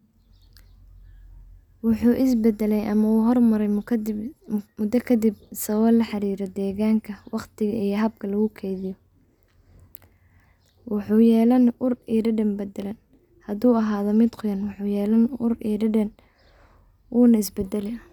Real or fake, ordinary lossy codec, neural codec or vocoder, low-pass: real; none; none; 19.8 kHz